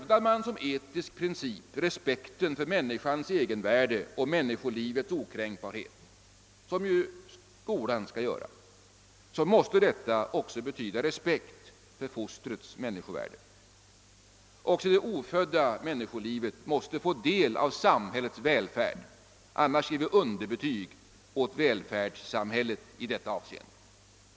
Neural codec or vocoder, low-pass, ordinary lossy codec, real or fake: none; none; none; real